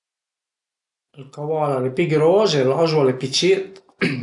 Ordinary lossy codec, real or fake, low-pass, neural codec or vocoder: none; real; 10.8 kHz; none